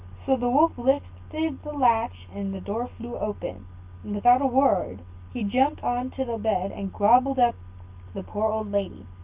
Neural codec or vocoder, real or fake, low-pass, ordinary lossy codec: none; real; 3.6 kHz; Opus, 24 kbps